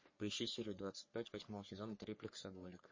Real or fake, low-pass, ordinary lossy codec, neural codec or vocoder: fake; 7.2 kHz; MP3, 32 kbps; codec, 44.1 kHz, 3.4 kbps, Pupu-Codec